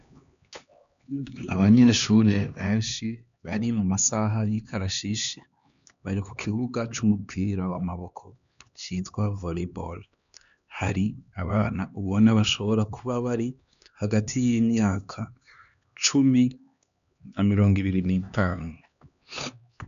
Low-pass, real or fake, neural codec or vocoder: 7.2 kHz; fake; codec, 16 kHz, 2 kbps, X-Codec, HuBERT features, trained on LibriSpeech